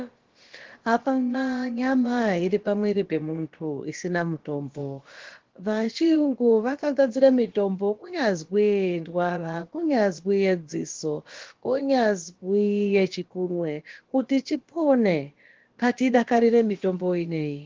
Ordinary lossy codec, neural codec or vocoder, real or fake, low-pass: Opus, 16 kbps; codec, 16 kHz, about 1 kbps, DyCAST, with the encoder's durations; fake; 7.2 kHz